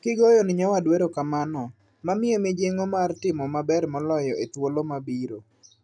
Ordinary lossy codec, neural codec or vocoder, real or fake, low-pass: none; none; real; 9.9 kHz